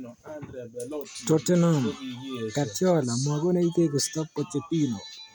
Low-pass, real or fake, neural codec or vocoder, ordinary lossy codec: none; real; none; none